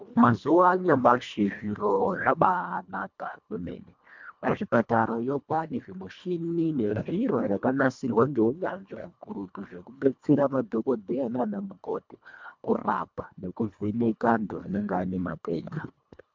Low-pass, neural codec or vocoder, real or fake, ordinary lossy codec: 7.2 kHz; codec, 24 kHz, 1.5 kbps, HILCodec; fake; MP3, 64 kbps